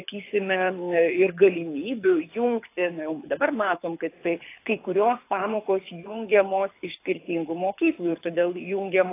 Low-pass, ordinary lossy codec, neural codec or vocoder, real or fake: 3.6 kHz; AAC, 24 kbps; codec, 24 kHz, 6 kbps, HILCodec; fake